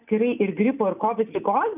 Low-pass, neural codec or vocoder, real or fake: 3.6 kHz; none; real